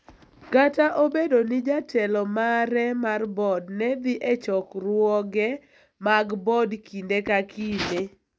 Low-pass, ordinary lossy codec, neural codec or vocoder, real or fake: none; none; none; real